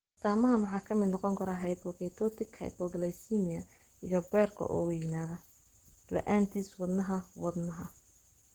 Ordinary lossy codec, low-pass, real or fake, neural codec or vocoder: Opus, 16 kbps; 19.8 kHz; fake; codec, 44.1 kHz, 7.8 kbps, Pupu-Codec